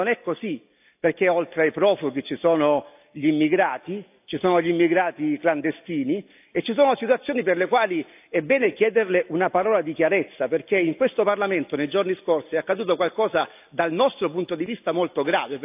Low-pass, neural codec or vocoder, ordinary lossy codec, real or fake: 3.6 kHz; none; AAC, 32 kbps; real